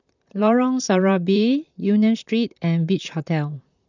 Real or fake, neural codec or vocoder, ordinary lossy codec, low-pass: fake; vocoder, 44.1 kHz, 128 mel bands, Pupu-Vocoder; none; 7.2 kHz